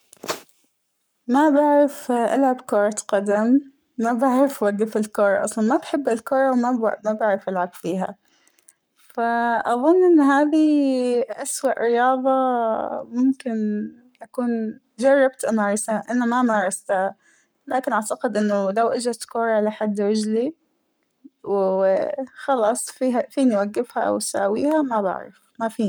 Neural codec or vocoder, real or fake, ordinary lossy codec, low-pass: codec, 44.1 kHz, 7.8 kbps, Pupu-Codec; fake; none; none